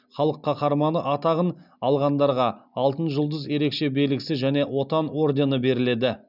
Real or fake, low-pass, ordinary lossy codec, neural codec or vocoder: real; 5.4 kHz; none; none